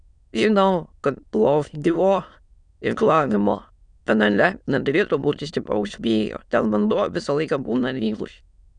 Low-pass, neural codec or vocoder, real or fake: 9.9 kHz; autoencoder, 22.05 kHz, a latent of 192 numbers a frame, VITS, trained on many speakers; fake